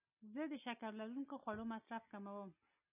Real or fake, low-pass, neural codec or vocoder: real; 3.6 kHz; none